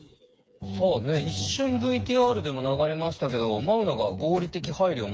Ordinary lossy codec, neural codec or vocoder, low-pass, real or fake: none; codec, 16 kHz, 4 kbps, FreqCodec, smaller model; none; fake